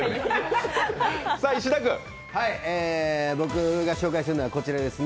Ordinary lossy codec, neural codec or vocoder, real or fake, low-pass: none; none; real; none